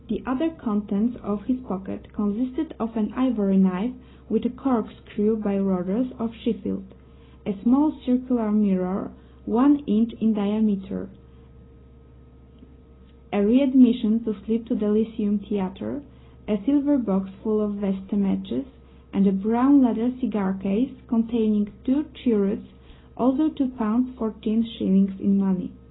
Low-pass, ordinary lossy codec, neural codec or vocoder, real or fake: 7.2 kHz; AAC, 16 kbps; none; real